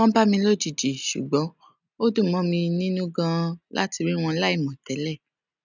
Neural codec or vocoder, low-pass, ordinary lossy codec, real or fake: none; 7.2 kHz; none; real